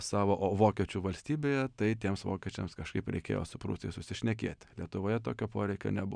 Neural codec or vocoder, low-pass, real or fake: none; 9.9 kHz; real